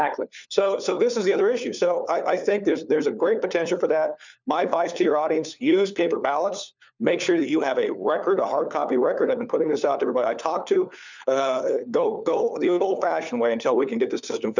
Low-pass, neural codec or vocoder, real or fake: 7.2 kHz; codec, 16 kHz, 4 kbps, FunCodec, trained on LibriTTS, 50 frames a second; fake